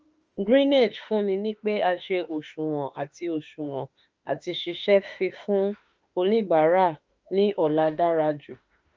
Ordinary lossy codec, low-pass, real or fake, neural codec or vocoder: Opus, 24 kbps; 7.2 kHz; fake; autoencoder, 48 kHz, 32 numbers a frame, DAC-VAE, trained on Japanese speech